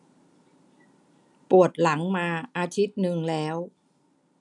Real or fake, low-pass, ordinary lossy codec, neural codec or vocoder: real; 10.8 kHz; none; none